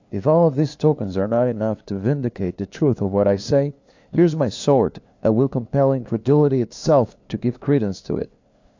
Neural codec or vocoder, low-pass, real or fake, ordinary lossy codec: codec, 16 kHz, 2 kbps, FunCodec, trained on LibriTTS, 25 frames a second; 7.2 kHz; fake; AAC, 48 kbps